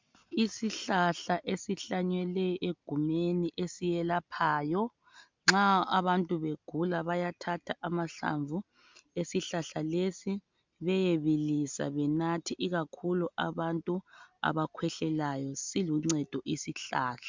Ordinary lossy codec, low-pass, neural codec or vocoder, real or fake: MP3, 64 kbps; 7.2 kHz; none; real